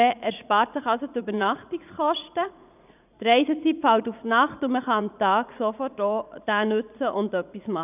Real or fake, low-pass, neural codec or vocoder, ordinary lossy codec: fake; 3.6 kHz; vocoder, 44.1 kHz, 80 mel bands, Vocos; none